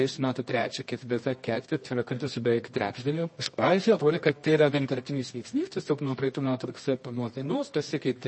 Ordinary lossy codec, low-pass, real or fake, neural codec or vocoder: MP3, 32 kbps; 9.9 kHz; fake; codec, 24 kHz, 0.9 kbps, WavTokenizer, medium music audio release